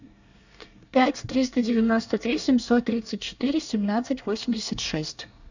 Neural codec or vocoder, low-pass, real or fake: codec, 24 kHz, 1 kbps, SNAC; 7.2 kHz; fake